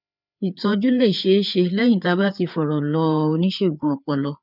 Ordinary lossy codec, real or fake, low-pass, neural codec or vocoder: none; fake; 5.4 kHz; codec, 16 kHz, 4 kbps, FreqCodec, larger model